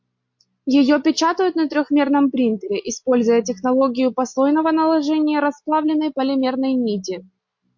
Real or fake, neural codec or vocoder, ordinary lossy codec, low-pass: real; none; MP3, 48 kbps; 7.2 kHz